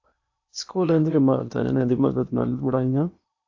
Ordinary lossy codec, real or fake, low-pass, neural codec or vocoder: AAC, 48 kbps; fake; 7.2 kHz; codec, 16 kHz in and 24 kHz out, 0.8 kbps, FocalCodec, streaming, 65536 codes